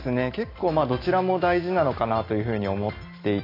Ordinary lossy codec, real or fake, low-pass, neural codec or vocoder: AAC, 24 kbps; real; 5.4 kHz; none